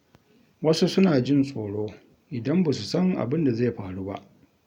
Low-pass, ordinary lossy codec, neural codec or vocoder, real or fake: 19.8 kHz; none; none; real